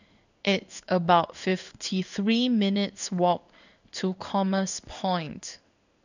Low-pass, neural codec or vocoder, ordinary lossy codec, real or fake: 7.2 kHz; codec, 16 kHz in and 24 kHz out, 1 kbps, XY-Tokenizer; none; fake